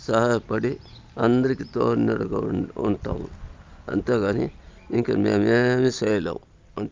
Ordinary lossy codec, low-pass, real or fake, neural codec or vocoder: Opus, 24 kbps; 7.2 kHz; real; none